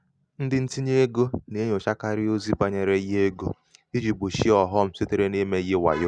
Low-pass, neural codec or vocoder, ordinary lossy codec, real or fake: 9.9 kHz; none; Opus, 64 kbps; real